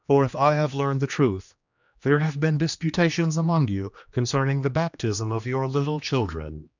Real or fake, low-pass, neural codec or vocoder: fake; 7.2 kHz; codec, 16 kHz, 2 kbps, X-Codec, HuBERT features, trained on general audio